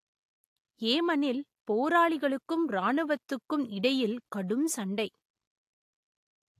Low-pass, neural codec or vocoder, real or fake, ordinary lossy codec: 14.4 kHz; none; real; AAC, 64 kbps